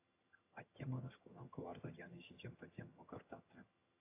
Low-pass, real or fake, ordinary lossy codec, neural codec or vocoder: 3.6 kHz; fake; AAC, 32 kbps; vocoder, 22.05 kHz, 80 mel bands, HiFi-GAN